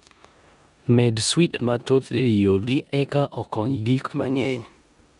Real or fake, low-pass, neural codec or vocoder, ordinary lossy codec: fake; 10.8 kHz; codec, 16 kHz in and 24 kHz out, 0.9 kbps, LongCat-Audio-Codec, four codebook decoder; none